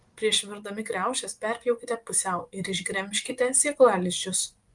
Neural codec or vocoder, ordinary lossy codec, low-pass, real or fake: vocoder, 24 kHz, 100 mel bands, Vocos; Opus, 32 kbps; 10.8 kHz; fake